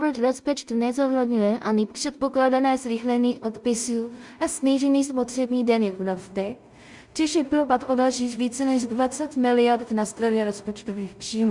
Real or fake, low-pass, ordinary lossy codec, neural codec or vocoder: fake; 10.8 kHz; Opus, 64 kbps; codec, 16 kHz in and 24 kHz out, 0.4 kbps, LongCat-Audio-Codec, two codebook decoder